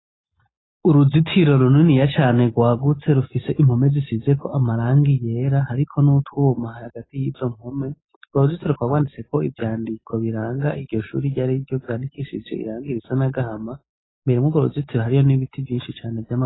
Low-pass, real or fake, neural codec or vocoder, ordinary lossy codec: 7.2 kHz; real; none; AAC, 16 kbps